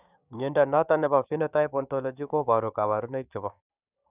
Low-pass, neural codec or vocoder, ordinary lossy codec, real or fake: 3.6 kHz; codec, 16 kHz, 6 kbps, DAC; none; fake